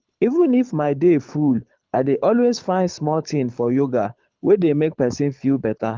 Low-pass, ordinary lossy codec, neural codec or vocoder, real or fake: 7.2 kHz; Opus, 32 kbps; codec, 24 kHz, 6 kbps, HILCodec; fake